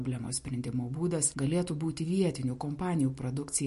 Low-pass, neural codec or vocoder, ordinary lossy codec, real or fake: 14.4 kHz; none; MP3, 48 kbps; real